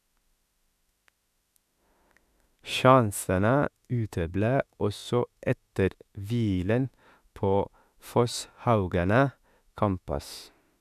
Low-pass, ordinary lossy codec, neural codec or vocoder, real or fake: 14.4 kHz; none; autoencoder, 48 kHz, 32 numbers a frame, DAC-VAE, trained on Japanese speech; fake